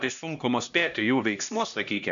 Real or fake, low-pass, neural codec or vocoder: fake; 7.2 kHz; codec, 16 kHz, 1 kbps, X-Codec, HuBERT features, trained on LibriSpeech